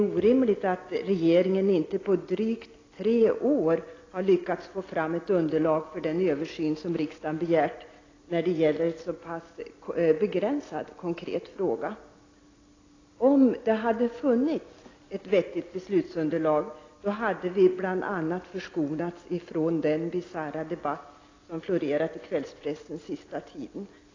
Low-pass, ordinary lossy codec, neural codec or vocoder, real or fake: 7.2 kHz; AAC, 32 kbps; none; real